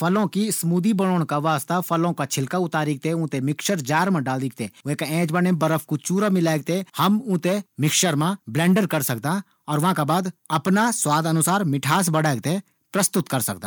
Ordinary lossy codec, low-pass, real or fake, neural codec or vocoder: none; none; real; none